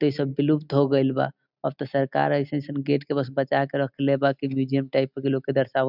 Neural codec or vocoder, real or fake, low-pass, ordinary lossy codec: none; real; 5.4 kHz; none